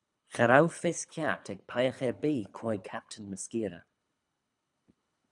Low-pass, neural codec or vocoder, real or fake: 10.8 kHz; codec, 24 kHz, 3 kbps, HILCodec; fake